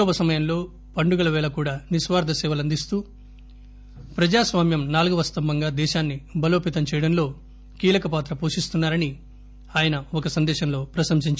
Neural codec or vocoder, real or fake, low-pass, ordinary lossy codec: none; real; none; none